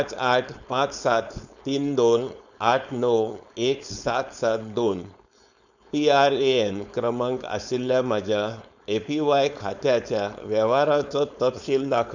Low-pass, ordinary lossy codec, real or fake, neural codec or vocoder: 7.2 kHz; none; fake; codec, 16 kHz, 4.8 kbps, FACodec